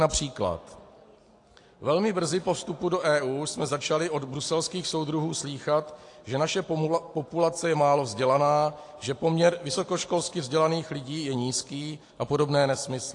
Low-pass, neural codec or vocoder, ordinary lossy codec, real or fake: 10.8 kHz; none; AAC, 48 kbps; real